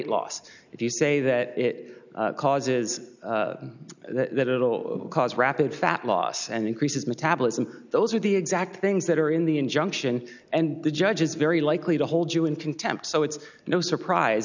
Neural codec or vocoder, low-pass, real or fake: none; 7.2 kHz; real